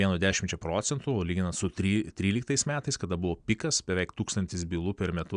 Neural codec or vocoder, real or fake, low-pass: none; real; 9.9 kHz